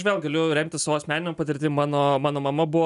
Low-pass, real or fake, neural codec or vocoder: 10.8 kHz; real; none